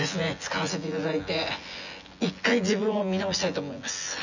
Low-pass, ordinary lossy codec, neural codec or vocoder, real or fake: 7.2 kHz; none; vocoder, 24 kHz, 100 mel bands, Vocos; fake